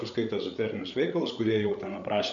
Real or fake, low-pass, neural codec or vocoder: fake; 7.2 kHz; codec, 16 kHz, 8 kbps, FreqCodec, larger model